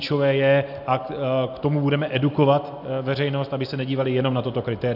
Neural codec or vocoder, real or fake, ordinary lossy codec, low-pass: none; real; AAC, 48 kbps; 5.4 kHz